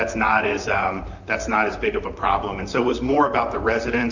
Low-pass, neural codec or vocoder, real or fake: 7.2 kHz; vocoder, 44.1 kHz, 128 mel bands, Pupu-Vocoder; fake